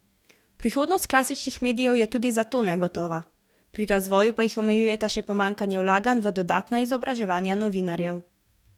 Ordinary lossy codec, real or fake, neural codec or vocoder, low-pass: none; fake; codec, 44.1 kHz, 2.6 kbps, DAC; 19.8 kHz